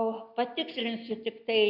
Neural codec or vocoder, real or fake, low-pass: none; real; 5.4 kHz